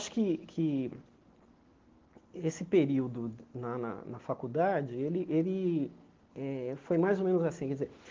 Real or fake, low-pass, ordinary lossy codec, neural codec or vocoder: real; 7.2 kHz; Opus, 16 kbps; none